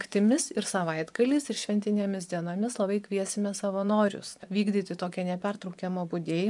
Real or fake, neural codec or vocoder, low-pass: fake; vocoder, 24 kHz, 100 mel bands, Vocos; 10.8 kHz